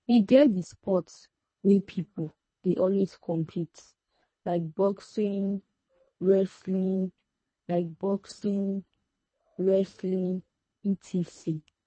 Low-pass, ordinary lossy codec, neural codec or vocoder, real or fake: 9.9 kHz; MP3, 32 kbps; codec, 24 kHz, 1.5 kbps, HILCodec; fake